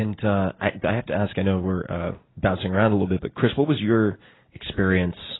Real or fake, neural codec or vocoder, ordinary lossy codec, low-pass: real; none; AAC, 16 kbps; 7.2 kHz